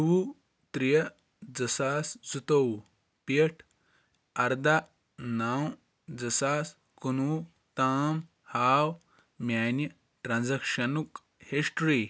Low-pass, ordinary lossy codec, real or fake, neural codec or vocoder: none; none; real; none